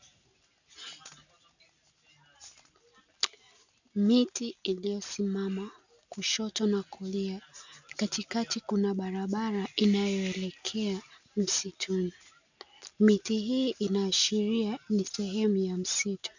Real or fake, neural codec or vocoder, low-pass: real; none; 7.2 kHz